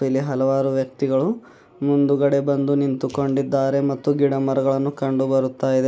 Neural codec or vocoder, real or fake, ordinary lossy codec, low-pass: none; real; none; none